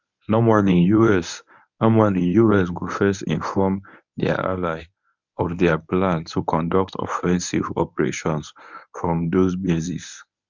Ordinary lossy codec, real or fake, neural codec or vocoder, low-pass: none; fake; codec, 24 kHz, 0.9 kbps, WavTokenizer, medium speech release version 1; 7.2 kHz